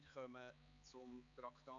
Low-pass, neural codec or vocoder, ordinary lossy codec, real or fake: 7.2 kHz; codec, 16 kHz, 4 kbps, X-Codec, HuBERT features, trained on balanced general audio; AAC, 64 kbps; fake